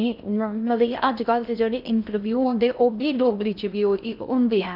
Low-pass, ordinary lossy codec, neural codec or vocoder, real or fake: 5.4 kHz; none; codec, 16 kHz in and 24 kHz out, 0.6 kbps, FocalCodec, streaming, 4096 codes; fake